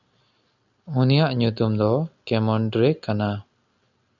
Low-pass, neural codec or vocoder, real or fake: 7.2 kHz; none; real